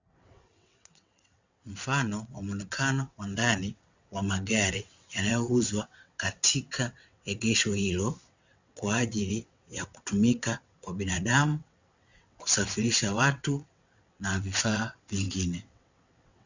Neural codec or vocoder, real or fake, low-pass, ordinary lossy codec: vocoder, 22.05 kHz, 80 mel bands, WaveNeXt; fake; 7.2 kHz; Opus, 64 kbps